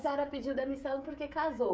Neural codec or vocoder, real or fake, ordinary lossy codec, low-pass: codec, 16 kHz, 16 kbps, FreqCodec, smaller model; fake; none; none